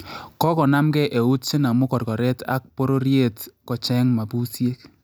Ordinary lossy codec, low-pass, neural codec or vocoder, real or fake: none; none; none; real